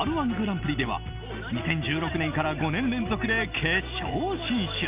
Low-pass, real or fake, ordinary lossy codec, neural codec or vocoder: 3.6 kHz; real; Opus, 24 kbps; none